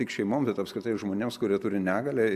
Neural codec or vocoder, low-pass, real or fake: none; 14.4 kHz; real